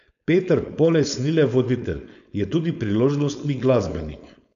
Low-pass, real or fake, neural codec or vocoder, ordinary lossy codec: 7.2 kHz; fake; codec, 16 kHz, 4.8 kbps, FACodec; none